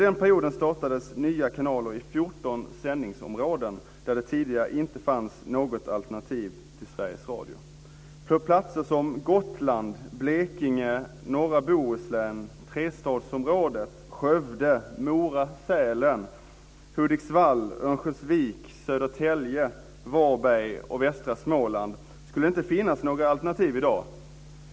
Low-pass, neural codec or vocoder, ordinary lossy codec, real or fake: none; none; none; real